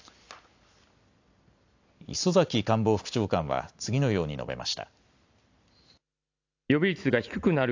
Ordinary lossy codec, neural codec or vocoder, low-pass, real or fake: AAC, 48 kbps; none; 7.2 kHz; real